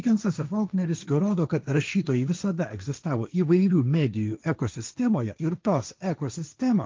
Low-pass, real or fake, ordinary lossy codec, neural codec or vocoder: 7.2 kHz; fake; Opus, 24 kbps; codec, 16 kHz, 1.1 kbps, Voila-Tokenizer